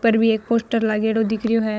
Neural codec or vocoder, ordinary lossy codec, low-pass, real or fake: codec, 16 kHz, 16 kbps, FunCodec, trained on Chinese and English, 50 frames a second; none; none; fake